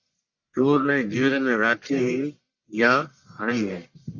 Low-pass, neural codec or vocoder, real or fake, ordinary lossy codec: 7.2 kHz; codec, 44.1 kHz, 1.7 kbps, Pupu-Codec; fake; Opus, 64 kbps